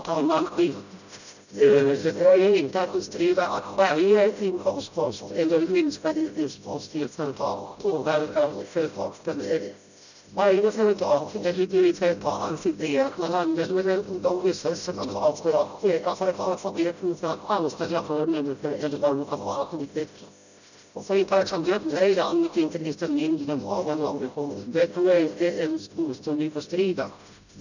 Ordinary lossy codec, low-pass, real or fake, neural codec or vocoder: none; 7.2 kHz; fake; codec, 16 kHz, 0.5 kbps, FreqCodec, smaller model